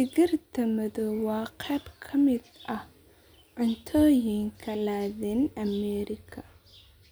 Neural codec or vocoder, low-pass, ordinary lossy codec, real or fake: none; none; none; real